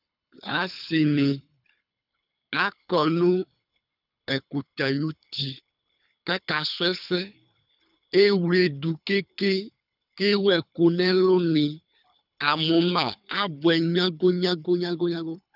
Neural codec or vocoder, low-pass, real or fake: codec, 24 kHz, 3 kbps, HILCodec; 5.4 kHz; fake